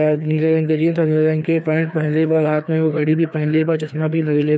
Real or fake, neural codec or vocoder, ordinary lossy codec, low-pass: fake; codec, 16 kHz, 2 kbps, FreqCodec, larger model; none; none